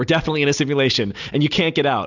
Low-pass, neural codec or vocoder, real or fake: 7.2 kHz; none; real